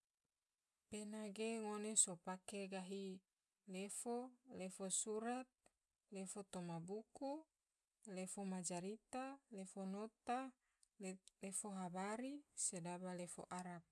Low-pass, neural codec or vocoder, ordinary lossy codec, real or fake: none; none; none; real